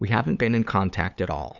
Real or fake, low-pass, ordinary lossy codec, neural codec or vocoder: fake; 7.2 kHz; Opus, 64 kbps; codec, 16 kHz, 8 kbps, FunCodec, trained on LibriTTS, 25 frames a second